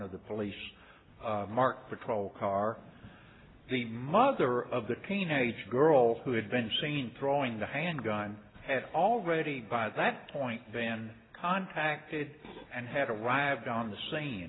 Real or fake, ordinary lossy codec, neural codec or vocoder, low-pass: real; AAC, 16 kbps; none; 7.2 kHz